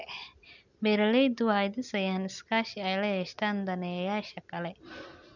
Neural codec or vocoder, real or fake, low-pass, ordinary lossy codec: none; real; 7.2 kHz; none